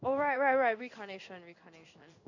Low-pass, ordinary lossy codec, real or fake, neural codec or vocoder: 7.2 kHz; none; fake; codec, 16 kHz in and 24 kHz out, 1 kbps, XY-Tokenizer